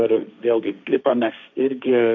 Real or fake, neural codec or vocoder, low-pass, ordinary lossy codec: fake; codec, 16 kHz, 1.1 kbps, Voila-Tokenizer; 7.2 kHz; MP3, 48 kbps